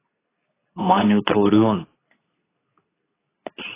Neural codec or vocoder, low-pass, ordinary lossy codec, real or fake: codec, 24 kHz, 0.9 kbps, WavTokenizer, medium speech release version 2; 3.6 kHz; AAC, 16 kbps; fake